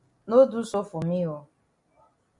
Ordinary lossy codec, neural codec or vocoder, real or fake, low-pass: MP3, 96 kbps; none; real; 10.8 kHz